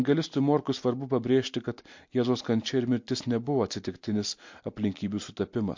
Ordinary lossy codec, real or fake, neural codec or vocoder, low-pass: MP3, 48 kbps; real; none; 7.2 kHz